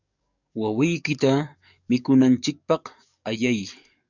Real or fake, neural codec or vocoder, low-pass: fake; codec, 44.1 kHz, 7.8 kbps, DAC; 7.2 kHz